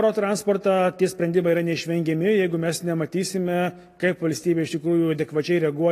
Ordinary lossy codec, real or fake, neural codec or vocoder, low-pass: AAC, 48 kbps; real; none; 14.4 kHz